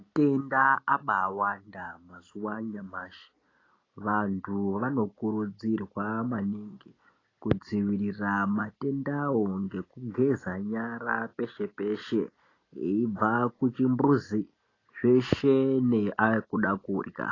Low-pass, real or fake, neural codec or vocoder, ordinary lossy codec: 7.2 kHz; real; none; AAC, 32 kbps